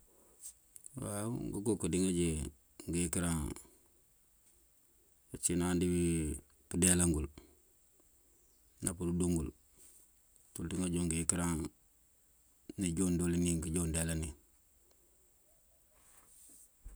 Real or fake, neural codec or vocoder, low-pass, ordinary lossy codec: real; none; none; none